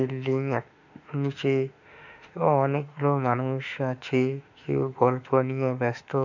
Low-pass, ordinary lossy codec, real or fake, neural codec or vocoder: 7.2 kHz; none; fake; autoencoder, 48 kHz, 32 numbers a frame, DAC-VAE, trained on Japanese speech